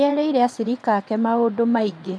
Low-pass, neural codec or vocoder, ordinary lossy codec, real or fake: none; vocoder, 22.05 kHz, 80 mel bands, WaveNeXt; none; fake